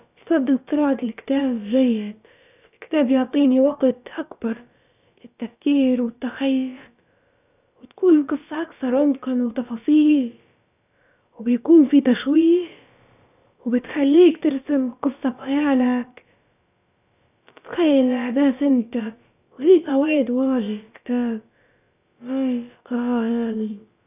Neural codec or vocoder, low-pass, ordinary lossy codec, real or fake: codec, 16 kHz, about 1 kbps, DyCAST, with the encoder's durations; 3.6 kHz; none; fake